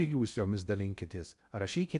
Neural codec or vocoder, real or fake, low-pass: codec, 16 kHz in and 24 kHz out, 0.6 kbps, FocalCodec, streaming, 2048 codes; fake; 10.8 kHz